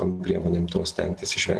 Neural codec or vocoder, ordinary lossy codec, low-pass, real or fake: none; Opus, 16 kbps; 10.8 kHz; real